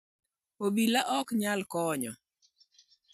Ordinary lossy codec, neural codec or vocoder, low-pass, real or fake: none; none; 14.4 kHz; real